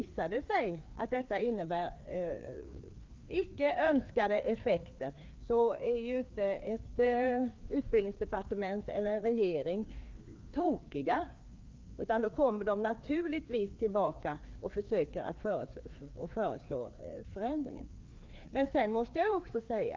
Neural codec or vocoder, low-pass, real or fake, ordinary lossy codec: codec, 16 kHz, 2 kbps, FreqCodec, larger model; 7.2 kHz; fake; Opus, 32 kbps